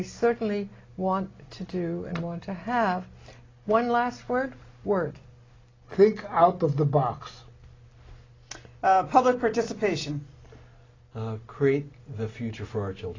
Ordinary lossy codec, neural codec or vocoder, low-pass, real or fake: AAC, 32 kbps; none; 7.2 kHz; real